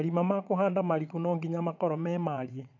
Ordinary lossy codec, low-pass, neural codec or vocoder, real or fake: none; 7.2 kHz; vocoder, 22.05 kHz, 80 mel bands, WaveNeXt; fake